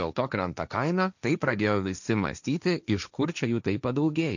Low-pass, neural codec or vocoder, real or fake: 7.2 kHz; codec, 16 kHz, 1.1 kbps, Voila-Tokenizer; fake